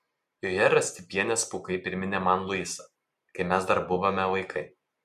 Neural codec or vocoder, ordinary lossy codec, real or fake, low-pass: none; MP3, 64 kbps; real; 14.4 kHz